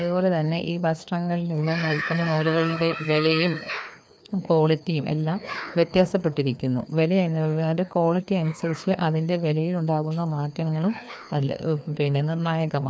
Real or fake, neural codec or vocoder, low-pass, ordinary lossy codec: fake; codec, 16 kHz, 2 kbps, FreqCodec, larger model; none; none